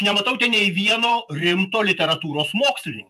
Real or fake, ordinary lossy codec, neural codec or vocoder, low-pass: fake; AAC, 96 kbps; vocoder, 48 kHz, 128 mel bands, Vocos; 14.4 kHz